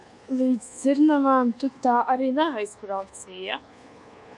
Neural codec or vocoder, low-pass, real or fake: codec, 24 kHz, 1.2 kbps, DualCodec; 10.8 kHz; fake